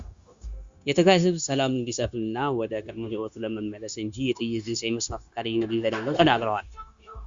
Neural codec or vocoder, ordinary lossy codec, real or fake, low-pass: codec, 16 kHz, 0.9 kbps, LongCat-Audio-Codec; Opus, 64 kbps; fake; 7.2 kHz